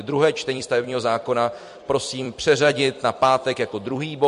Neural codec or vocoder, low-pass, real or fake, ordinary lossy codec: vocoder, 44.1 kHz, 128 mel bands every 256 samples, BigVGAN v2; 14.4 kHz; fake; MP3, 48 kbps